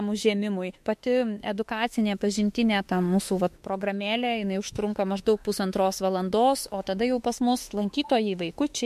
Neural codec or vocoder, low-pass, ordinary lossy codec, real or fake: autoencoder, 48 kHz, 32 numbers a frame, DAC-VAE, trained on Japanese speech; 14.4 kHz; MP3, 64 kbps; fake